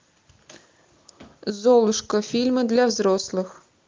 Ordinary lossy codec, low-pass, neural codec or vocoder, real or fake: Opus, 32 kbps; 7.2 kHz; none; real